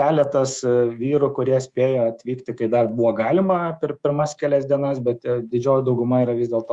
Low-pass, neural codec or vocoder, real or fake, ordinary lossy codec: 10.8 kHz; codec, 24 kHz, 3.1 kbps, DualCodec; fake; Opus, 64 kbps